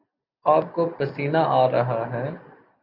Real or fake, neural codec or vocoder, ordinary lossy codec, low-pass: real; none; AAC, 48 kbps; 5.4 kHz